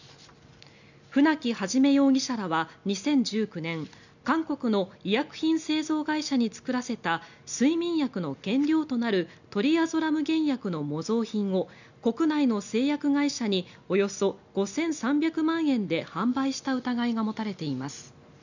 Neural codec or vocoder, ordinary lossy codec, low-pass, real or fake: none; none; 7.2 kHz; real